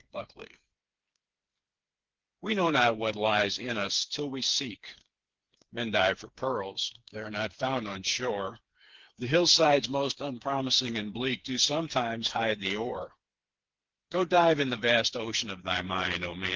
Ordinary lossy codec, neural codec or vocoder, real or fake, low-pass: Opus, 16 kbps; codec, 16 kHz, 4 kbps, FreqCodec, smaller model; fake; 7.2 kHz